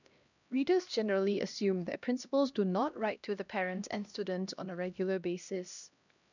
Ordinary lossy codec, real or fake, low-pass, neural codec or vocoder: none; fake; 7.2 kHz; codec, 16 kHz, 1 kbps, X-Codec, HuBERT features, trained on LibriSpeech